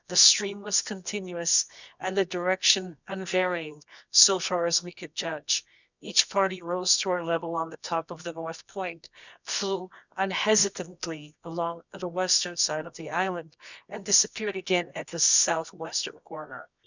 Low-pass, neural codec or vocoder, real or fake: 7.2 kHz; codec, 24 kHz, 0.9 kbps, WavTokenizer, medium music audio release; fake